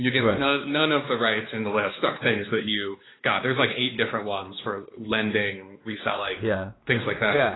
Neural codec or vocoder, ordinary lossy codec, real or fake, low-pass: codec, 16 kHz, 2 kbps, X-Codec, WavLM features, trained on Multilingual LibriSpeech; AAC, 16 kbps; fake; 7.2 kHz